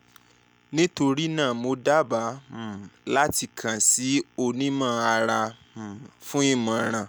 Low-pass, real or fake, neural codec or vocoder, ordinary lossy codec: none; real; none; none